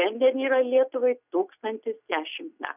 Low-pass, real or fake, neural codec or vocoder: 3.6 kHz; real; none